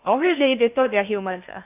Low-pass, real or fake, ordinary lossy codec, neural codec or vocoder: 3.6 kHz; fake; AAC, 32 kbps; codec, 16 kHz in and 24 kHz out, 0.6 kbps, FocalCodec, streaming, 2048 codes